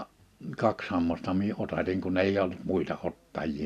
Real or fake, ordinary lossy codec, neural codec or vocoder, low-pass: real; none; none; 14.4 kHz